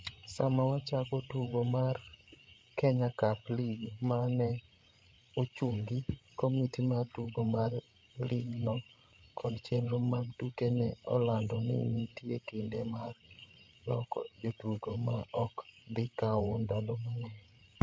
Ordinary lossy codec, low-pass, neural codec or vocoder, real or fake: none; none; codec, 16 kHz, 8 kbps, FreqCodec, larger model; fake